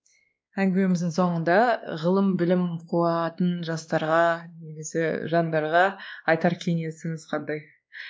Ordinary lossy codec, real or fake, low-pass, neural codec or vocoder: none; fake; none; codec, 16 kHz, 2 kbps, X-Codec, WavLM features, trained on Multilingual LibriSpeech